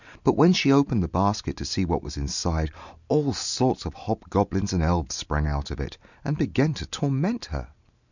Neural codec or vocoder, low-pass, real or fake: none; 7.2 kHz; real